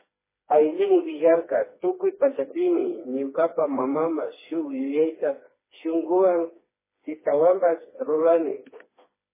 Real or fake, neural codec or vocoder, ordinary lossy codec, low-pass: fake; codec, 16 kHz, 2 kbps, FreqCodec, smaller model; MP3, 16 kbps; 3.6 kHz